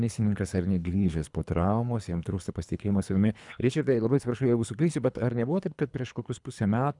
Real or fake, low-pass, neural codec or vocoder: fake; 10.8 kHz; codec, 24 kHz, 3 kbps, HILCodec